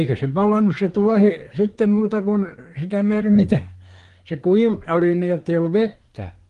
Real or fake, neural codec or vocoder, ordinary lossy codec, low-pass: fake; codec, 24 kHz, 1 kbps, SNAC; Opus, 24 kbps; 10.8 kHz